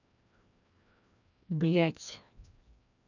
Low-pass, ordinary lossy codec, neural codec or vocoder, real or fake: 7.2 kHz; none; codec, 16 kHz, 1 kbps, FreqCodec, larger model; fake